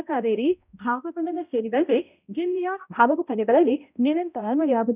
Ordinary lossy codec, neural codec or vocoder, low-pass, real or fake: none; codec, 16 kHz, 0.5 kbps, X-Codec, HuBERT features, trained on balanced general audio; 3.6 kHz; fake